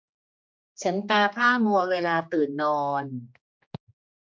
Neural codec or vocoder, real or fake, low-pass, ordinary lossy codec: codec, 16 kHz, 2 kbps, X-Codec, HuBERT features, trained on general audio; fake; none; none